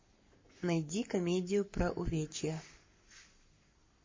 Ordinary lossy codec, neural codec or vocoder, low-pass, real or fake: MP3, 32 kbps; codec, 44.1 kHz, 7.8 kbps, Pupu-Codec; 7.2 kHz; fake